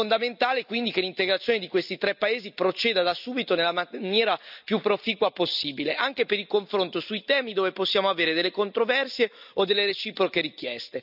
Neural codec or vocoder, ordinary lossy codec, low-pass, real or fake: none; none; 5.4 kHz; real